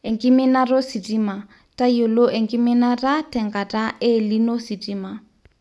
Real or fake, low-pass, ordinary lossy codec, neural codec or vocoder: real; none; none; none